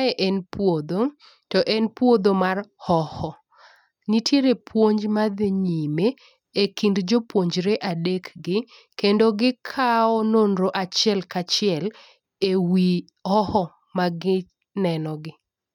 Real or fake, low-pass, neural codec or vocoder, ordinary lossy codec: real; 19.8 kHz; none; none